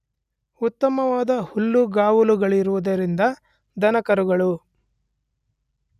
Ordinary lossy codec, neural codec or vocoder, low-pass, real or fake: none; none; 14.4 kHz; real